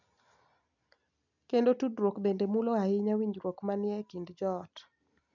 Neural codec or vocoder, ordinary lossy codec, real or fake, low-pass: none; none; real; 7.2 kHz